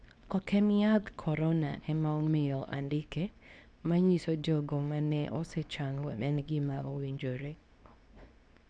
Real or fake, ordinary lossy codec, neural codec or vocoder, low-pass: fake; none; codec, 24 kHz, 0.9 kbps, WavTokenizer, medium speech release version 2; none